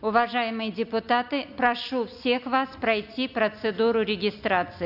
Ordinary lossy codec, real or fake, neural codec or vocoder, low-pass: none; real; none; 5.4 kHz